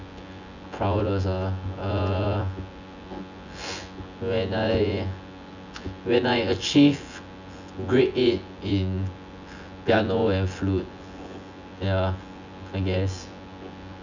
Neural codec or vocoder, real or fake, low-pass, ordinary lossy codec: vocoder, 24 kHz, 100 mel bands, Vocos; fake; 7.2 kHz; none